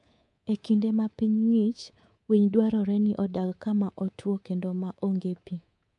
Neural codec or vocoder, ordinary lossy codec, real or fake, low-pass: codec, 24 kHz, 3.1 kbps, DualCodec; MP3, 64 kbps; fake; 10.8 kHz